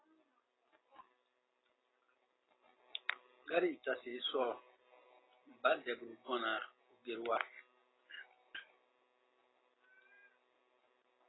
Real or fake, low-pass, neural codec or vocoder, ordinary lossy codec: real; 7.2 kHz; none; AAC, 16 kbps